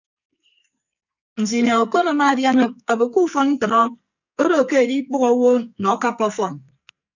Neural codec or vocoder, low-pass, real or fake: codec, 44.1 kHz, 2.6 kbps, SNAC; 7.2 kHz; fake